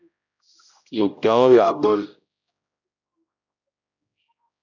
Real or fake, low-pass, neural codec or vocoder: fake; 7.2 kHz; codec, 16 kHz, 0.5 kbps, X-Codec, HuBERT features, trained on general audio